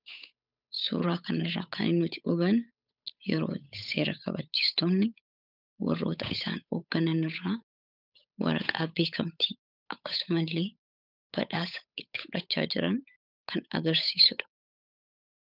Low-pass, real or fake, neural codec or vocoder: 5.4 kHz; fake; codec, 16 kHz, 8 kbps, FunCodec, trained on Chinese and English, 25 frames a second